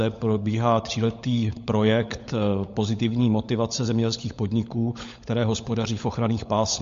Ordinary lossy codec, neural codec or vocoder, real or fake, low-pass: MP3, 48 kbps; codec, 16 kHz, 16 kbps, FunCodec, trained on LibriTTS, 50 frames a second; fake; 7.2 kHz